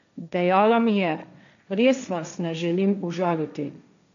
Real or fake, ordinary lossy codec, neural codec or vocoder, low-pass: fake; MP3, 96 kbps; codec, 16 kHz, 1.1 kbps, Voila-Tokenizer; 7.2 kHz